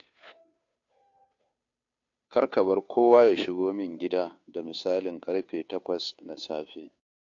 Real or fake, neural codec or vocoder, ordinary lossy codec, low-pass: fake; codec, 16 kHz, 2 kbps, FunCodec, trained on Chinese and English, 25 frames a second; AAC, 48 kbps; 7.2 kHz